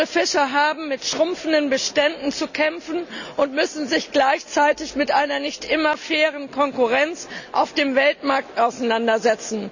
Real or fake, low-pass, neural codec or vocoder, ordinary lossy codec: real; 7.2 kHz; none; none